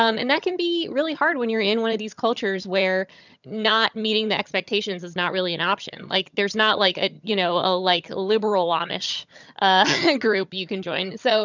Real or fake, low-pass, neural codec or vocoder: fake; 7.2 kHz; vocoder, 22.05 kHz, 80 mel bands, HiFi-GAN